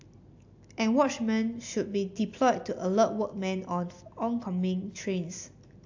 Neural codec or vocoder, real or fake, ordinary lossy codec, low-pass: none; real; AAC, 48 kbps; 7.2 kHz